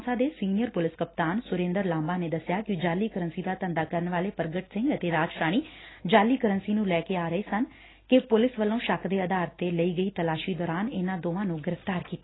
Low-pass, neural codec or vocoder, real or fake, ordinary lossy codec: 7.2 kHz; none; real; AAC, 16 kbps